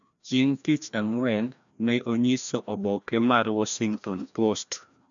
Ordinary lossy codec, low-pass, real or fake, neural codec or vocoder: none; 7.2 kHz; fake; codec, 16 kHz, 1 kbps, FreqCodec, larger model